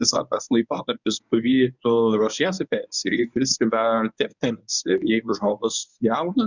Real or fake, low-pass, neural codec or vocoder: fake; 7.2 kHz; codec, 24 kHz, 0.9 kbps, WavTokenizer, medium speech release version 1